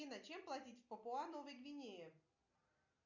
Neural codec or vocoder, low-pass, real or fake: none; 7.2 kHz; real